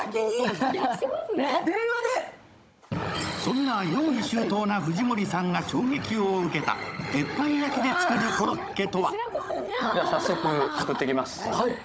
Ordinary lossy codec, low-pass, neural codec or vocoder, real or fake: none; none; codec, 16 kHz, 16 kbps, FunCodec, trained on Chinese and English, 50 frames a second; fake